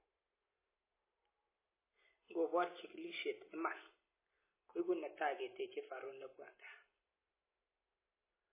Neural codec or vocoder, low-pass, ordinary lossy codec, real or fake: none; 3.6 kHz; MP3, 16 kbps; real